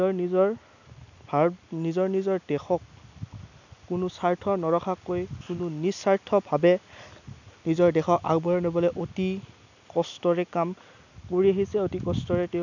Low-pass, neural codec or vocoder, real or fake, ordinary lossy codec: 7.2 kHz; none; real; none